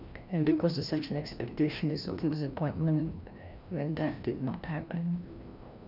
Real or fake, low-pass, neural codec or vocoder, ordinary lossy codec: fake; 5.4 kHz; codec, 16 kHz, 1 kbps, FreqCodec, larger model; none